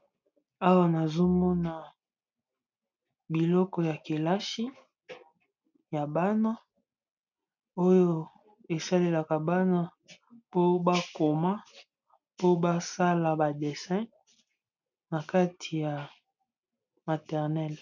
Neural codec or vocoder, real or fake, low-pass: none; real; 7.2 kHz